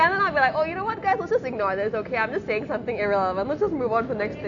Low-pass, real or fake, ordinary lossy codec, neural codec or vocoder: 7.2 kHz; real; none; none